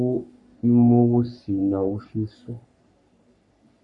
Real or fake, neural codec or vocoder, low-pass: fake; codec, 44.1 kHz, 3.4 kbps, Pupu-Codec; 10.8 kHz